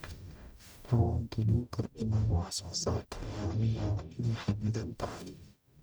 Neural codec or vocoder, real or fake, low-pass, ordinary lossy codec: codec, 44.1 kHz, 0.9 kbps, DAC; fake; none; none